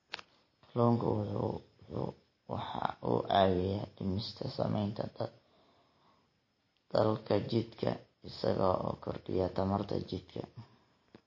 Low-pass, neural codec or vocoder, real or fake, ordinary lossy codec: 7.2 kHz; none; real; MP3, 32 kbps